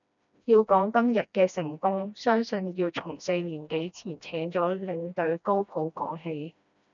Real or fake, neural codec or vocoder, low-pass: fake; codec, 16 kHz, 1 kbps, FreqCodec, smaller model; 7.2 kHz